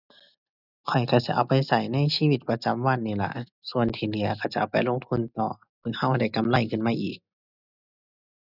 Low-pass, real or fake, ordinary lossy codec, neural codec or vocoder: 5.4 kHz; real; none; none